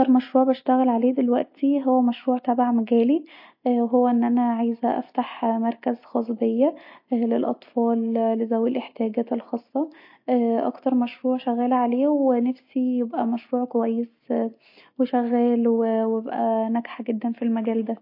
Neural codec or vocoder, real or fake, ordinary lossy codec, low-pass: none; real; MP3, 32 kbps; 5.4 kHz